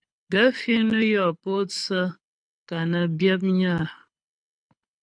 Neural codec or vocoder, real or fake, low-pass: codec, 24 kHz, 6 kbps, HILCodec; fake; 9.9 kHz